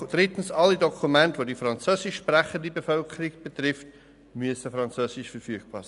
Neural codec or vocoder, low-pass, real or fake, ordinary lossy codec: none; 10.8 kHz; real; none